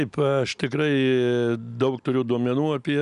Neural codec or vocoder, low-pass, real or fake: none; 10.8 kHz; real